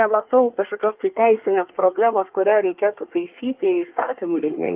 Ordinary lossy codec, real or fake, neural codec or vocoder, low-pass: Opus, 32 kbps; fake; codec, 24 kHz, 1 kbps, SNAC; 3.6 kHz